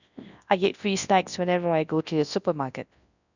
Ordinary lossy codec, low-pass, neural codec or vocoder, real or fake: none; 7.2 kHz; codec, 24 kHz, 0.9 kbps, WavTokenizer, large speech release; fake